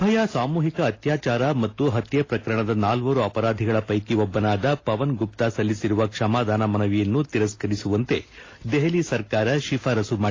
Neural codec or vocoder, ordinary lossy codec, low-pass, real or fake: none; AAC, 32 kbps; 7.2 kHz; real